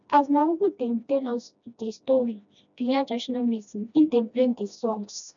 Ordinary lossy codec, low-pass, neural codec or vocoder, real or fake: none; 7.2 kHz; codec, 16 kHz, 1 kbps, FreqCodec, smaller model; fake